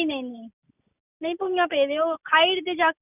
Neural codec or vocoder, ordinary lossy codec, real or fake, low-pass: none; none; real; 3.6 kHz